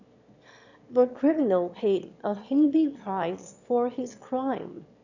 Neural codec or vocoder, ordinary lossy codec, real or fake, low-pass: autoencoder, 22.05 kHz, a latent of 192 numbers a frame, VITS, trained on one speaker; none; fake; 7.2 kHz